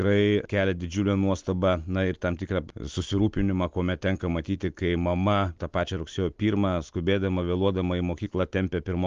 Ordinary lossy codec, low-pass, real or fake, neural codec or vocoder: Opus, 32 kbps; 7.2 kHz; real; none